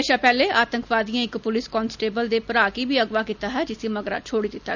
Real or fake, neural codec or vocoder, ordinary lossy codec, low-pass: real; none; none; 7.2 kHz